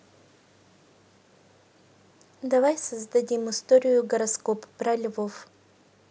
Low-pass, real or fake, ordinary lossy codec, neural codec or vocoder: none; real; none; none